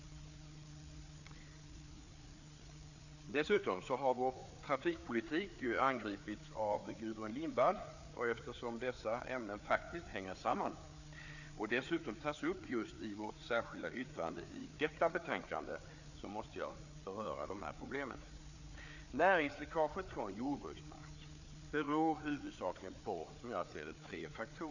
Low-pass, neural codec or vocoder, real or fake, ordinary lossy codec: 7.2 kHz; codec, 16 kHz, 4 kbps, FreqCodec, larger model; fake; none